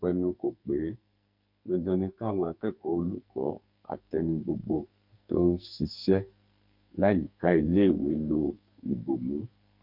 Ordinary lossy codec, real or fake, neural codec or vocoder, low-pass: MP3, 48 kbps; fake; codec, 44.1 kHz, 2.6 kbps, SNAC; 5.4 kHz